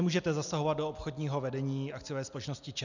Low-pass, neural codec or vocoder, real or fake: 7.2 kHz; none; real